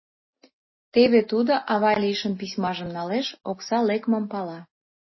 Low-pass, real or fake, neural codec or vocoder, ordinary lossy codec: 7.2 kHz; real; none; MP3, 24 kbps